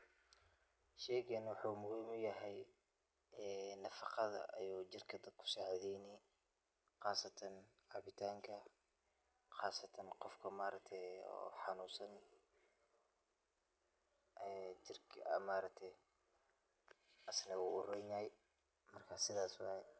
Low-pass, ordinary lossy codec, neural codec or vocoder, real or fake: none; none; none; real